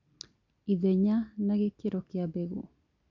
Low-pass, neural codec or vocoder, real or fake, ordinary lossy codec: 7.2 kHz; none; real; none